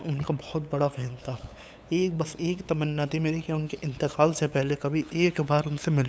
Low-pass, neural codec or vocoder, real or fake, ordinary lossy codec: none; codec, 16 kHz, 8 kbps, FunCodec, trained on LibriTTS, 25 frames a second; fake; none